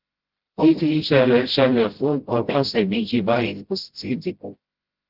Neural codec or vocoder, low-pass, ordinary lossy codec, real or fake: codec, 16 kHz, 0.5 kbps, FreqCodec, smaller model; 5.4 kHz; Opus, 16 kbps; fake